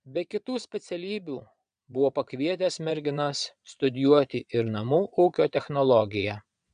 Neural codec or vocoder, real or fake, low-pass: vocoder, 22.05 kHz, 80 mel bands, Vocos; fake; 9.9 kHz